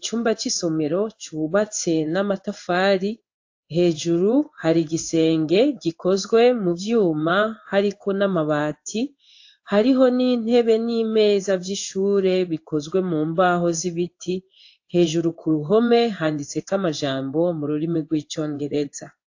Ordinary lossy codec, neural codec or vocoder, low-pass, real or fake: AAC, 48 kbps; codec, 16 kHz in and 24 kHz out, 1 kbps, XY-Tokenizer; 7.2 kHz; fake